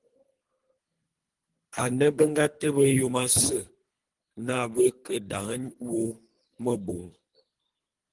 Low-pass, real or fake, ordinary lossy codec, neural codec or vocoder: 10.8 kHz; fake; Opus, 24 kbps; codec, 24 kHz, 3 kbps, HILCodec